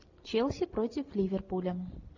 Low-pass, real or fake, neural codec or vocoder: 7.2 kHz; real; none